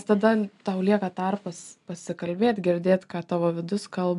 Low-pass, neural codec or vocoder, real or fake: 10.8 kHz; none; real